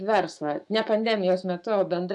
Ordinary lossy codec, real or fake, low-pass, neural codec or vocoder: AAC, 64 kbps; fake; 10.8 kHz; autoencoder, 48 kHz, 128 numbers a frame, DAC-VAE, trained on Japanese speech